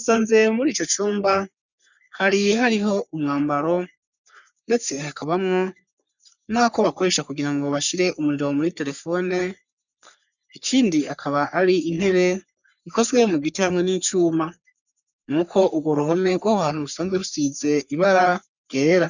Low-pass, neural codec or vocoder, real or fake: 7.2 kHz; codec, 44.1 kHz, 3.4 kbps, Pupu-Codec; fake